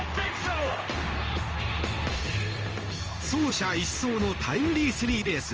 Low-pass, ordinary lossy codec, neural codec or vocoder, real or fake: 7.2 kHz; Opus, 24 kbps; codec, 16 kHz in and 24 kHz out, 1 kbps, XY-Tokenizer; fake